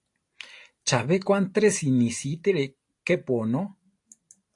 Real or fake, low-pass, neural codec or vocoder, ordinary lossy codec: real; 10.8 kHz; none; AAC, 48 kbps